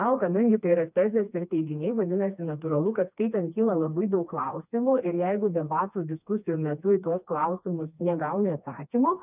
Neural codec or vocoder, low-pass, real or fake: codec, 16 kHz, 2 kbps, FreqCodec, smaller model; 3.6 kHz; fake